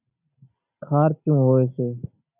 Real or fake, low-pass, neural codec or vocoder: real; 3.6 kHz; none